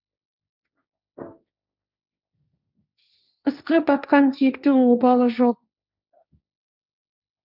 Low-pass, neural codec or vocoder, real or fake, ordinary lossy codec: 5.4 kHz; codec, 16 kHz, 1.1 kbps, Voila-Tokenizer; fake; none